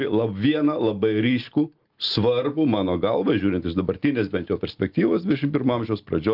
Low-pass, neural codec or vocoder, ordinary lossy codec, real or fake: 5.4 kHz; none; Opus, 32 kbps; real